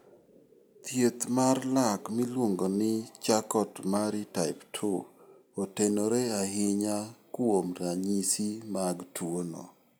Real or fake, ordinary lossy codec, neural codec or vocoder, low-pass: real; none; none; none